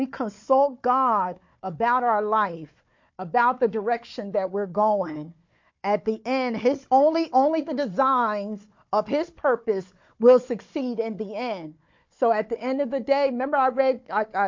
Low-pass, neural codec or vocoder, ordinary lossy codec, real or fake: 7.2 kHz; codec, 16 kHz, 4 kbps, FunCodec, trained on Chinese and English, 50 frames a second; MP3, 48 kbps; fake